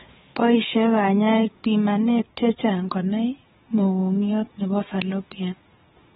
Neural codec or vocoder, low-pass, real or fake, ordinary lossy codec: codec, 16 kHz, 16 kbps, FunCodec, trained on Chinese and English, 50 frames a second; 7.2 kHz; fake; AAC, 16 kbps